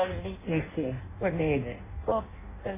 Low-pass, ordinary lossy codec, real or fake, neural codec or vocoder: 3.6 kHz; MP3, 16 kbps; fake; codec, 16 kHz in and 24 kHz out, 0.6 kbps, FireRedTTS-2 codec